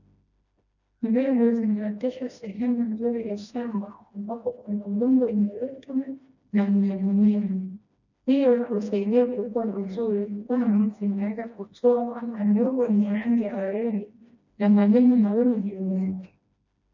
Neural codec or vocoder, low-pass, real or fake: codec, 16 kHz, 1 kbps, FreqCodec, smaller model; 7.2 kHz; fake